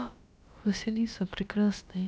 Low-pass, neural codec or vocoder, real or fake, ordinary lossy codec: none; codec, 16 kHz, about 1 kbps, DyCAST, with the encoder's durations; fake; none